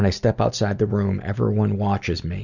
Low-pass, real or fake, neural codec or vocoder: 7.2 kHz; real; none